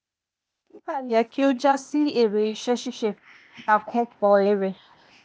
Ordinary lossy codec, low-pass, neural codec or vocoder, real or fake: none; none; codec, 16 kHz, 0.8 kbps, ZipCodec; fake